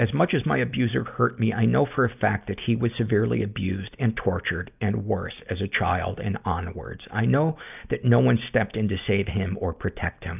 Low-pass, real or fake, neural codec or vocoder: 3.6 kHz; real; none